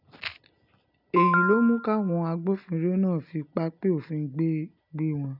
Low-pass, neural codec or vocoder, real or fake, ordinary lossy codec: 5.4 kHz; none; real; none